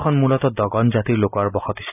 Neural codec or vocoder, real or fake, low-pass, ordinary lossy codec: none; real; 3.6 kHz; none